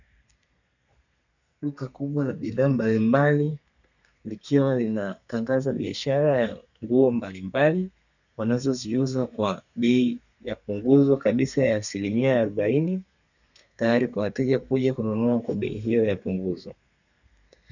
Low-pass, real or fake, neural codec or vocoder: 7.2 kHz; fake; codec, 32 kHz, 1.9 kbps, SNAC